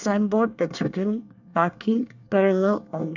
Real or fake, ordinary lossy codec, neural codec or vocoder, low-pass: fake; none; codec, 24 kHz, 1 kbps, SNAC; 7.2 kHz